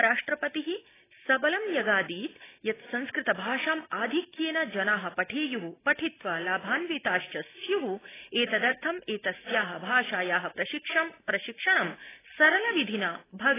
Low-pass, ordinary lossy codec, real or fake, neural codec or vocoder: 3.6 kHz; AAC, 16 kbps; real; none